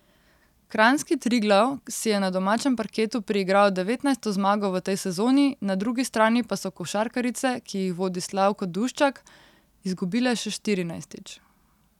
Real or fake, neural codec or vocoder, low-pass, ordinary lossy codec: real; none; 19.8 kHz; none